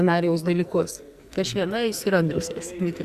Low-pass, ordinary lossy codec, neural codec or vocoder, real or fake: 14.4 kHz; Opus, 64 kbps; codec, 44.1 kHz, 2.6 kbps, SNAC; fake